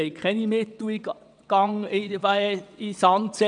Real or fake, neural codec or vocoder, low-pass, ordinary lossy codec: fake; vocoder, 22.05 kHz, 80 mel bands, WaveNeXt; 9.9 kHz; none